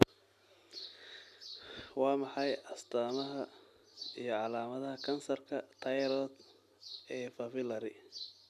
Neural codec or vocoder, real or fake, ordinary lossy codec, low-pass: none; real; none; 14.4 kHz